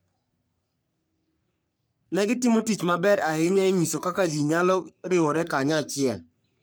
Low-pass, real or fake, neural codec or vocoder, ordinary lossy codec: none; fake; codec, 44.1 kHz, 3.4 kbps, Pupu-Codec; none